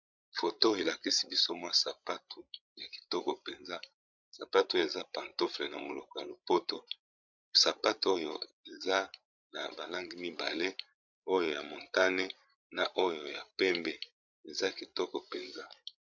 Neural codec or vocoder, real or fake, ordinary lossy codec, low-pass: codec, 16 kHz, 16 kbps, FreqCodec, larger model; fake; MP3, 64 kbps; 7.2 kHz